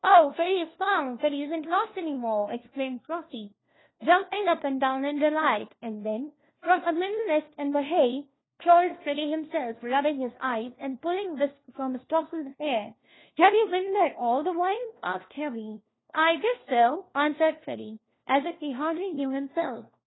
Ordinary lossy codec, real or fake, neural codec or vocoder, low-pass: AAC, 16 kbps; fake; codec, 16 kHz, 1 kbps, FunCodec, trained on LibriTTS, 50 frames a second; 7.2 kHz